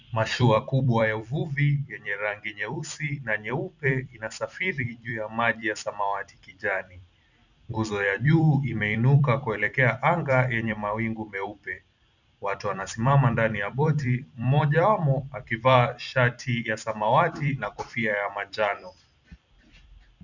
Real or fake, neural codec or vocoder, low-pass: fake; vocoder, 44.1 kHz, 128 mel bands every 256 samples, BigVGAN v2; 7.2 kHz